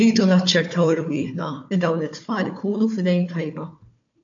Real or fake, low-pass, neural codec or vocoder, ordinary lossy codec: fake; 7.2 kHz; codec, 16 kHz, 4 kbps, FunCodec, trained on Chinese and English, 50 frames a second; AAC, 48 kbps